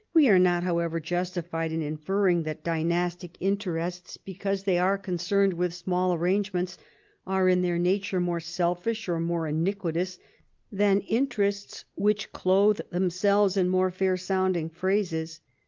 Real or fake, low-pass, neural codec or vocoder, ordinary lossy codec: real; 7.2 kHz; none; Opus, 32 kbps